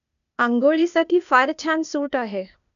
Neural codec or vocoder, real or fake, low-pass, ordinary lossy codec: codec, 16 kHz, 0.8 kbps, ZipCodec; fake; 7.2 kHz; none